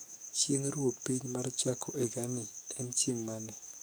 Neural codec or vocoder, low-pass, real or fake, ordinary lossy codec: codec, 44.1 kHz, 7.8 kbps, DAC; none; fake; none